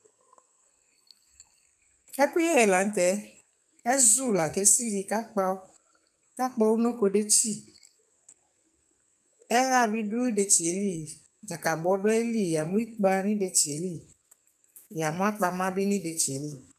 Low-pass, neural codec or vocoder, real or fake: 14.4 kHz; codec, 44.1 kHz, 2.6 kbps, SNAC; fake